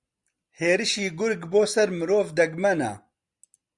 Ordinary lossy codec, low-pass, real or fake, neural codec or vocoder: Opus, 64 kbps; 10.8 kHz; real; none